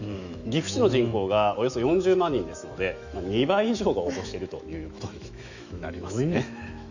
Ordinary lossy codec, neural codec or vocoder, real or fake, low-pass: none; autoencoder, 48 kHz, 128 numbers a frame, DAC-VAE, trained on Japanese speech; fake; 7.2 kHz